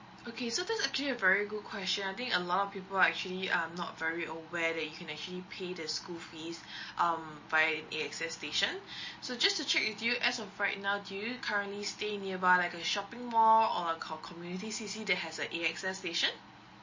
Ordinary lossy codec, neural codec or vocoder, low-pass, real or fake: MP3, 32 kbps; none; 7.2 kHz; real